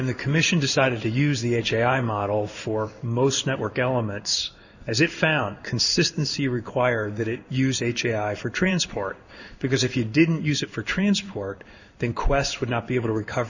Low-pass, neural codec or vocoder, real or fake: 7.2 kHz; codec, 16 kHz in and 24 kHz out, 1 kbps, XY-Tokenizer; fake